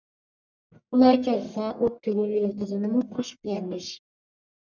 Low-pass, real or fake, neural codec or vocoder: 7.2 kHz; fake; codec, 44.1 kHz, 1.7 kbps, Pupu-Codec